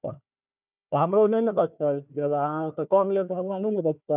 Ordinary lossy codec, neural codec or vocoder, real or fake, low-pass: none; codec, 16 kHz, 1 kbps, FunCodec, trained on Chinese and English, 50 frames a second; fake; 3.6 kHz